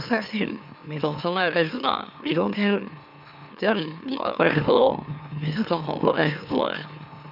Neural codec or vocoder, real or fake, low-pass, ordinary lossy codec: autoencoder, 44.1 kHz, a latent of 192 numbers a frame, MeloTTS; fake; 5.4 kHz; none